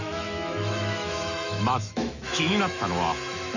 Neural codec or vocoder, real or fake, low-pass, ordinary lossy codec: autoencoder, 48 kHz, 128 numbers a frame, DAC-VAE, trained on Japanese speech; fake; 7.2 kHz; none